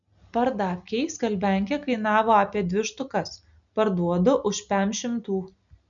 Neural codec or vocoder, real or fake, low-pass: none; real; 7.2 kHz